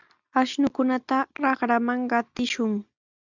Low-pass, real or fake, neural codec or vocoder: 7.2 kHz; real; none